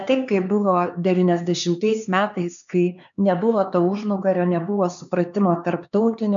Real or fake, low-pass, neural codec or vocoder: fake; 7.2 kHz; codec, 16 kHz, 4 kbps, X-Codec, HuBERT features, trained on LibriSpeech